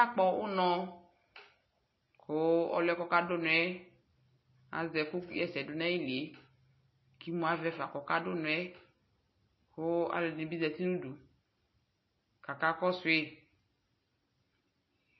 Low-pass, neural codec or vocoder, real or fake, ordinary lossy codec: 7.2 kHz; none; real; MP3, 24 kbps